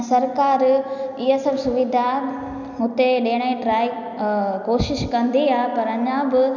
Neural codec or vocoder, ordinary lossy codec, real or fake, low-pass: none; none; real; 7.2 kHz